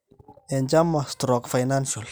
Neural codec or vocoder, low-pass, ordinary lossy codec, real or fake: none; none; none; real